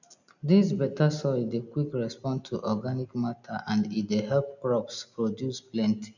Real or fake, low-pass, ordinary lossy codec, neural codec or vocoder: real; 7.2 kHz; none; none